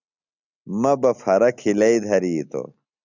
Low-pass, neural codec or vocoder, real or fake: 7.2 kHz; none; real